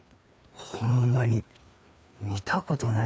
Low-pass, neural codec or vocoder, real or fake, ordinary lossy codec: none; codec, 16 kHz, 2 kbps, FreqCodec, larger model; fake; none